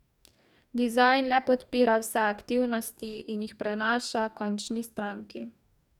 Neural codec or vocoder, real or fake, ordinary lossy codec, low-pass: codec, 44.1 kHz, 2.6 kbps, DAC; fake; none; 19.8 kHz